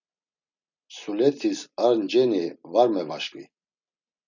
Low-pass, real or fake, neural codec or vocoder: 7.2 kHz; real; none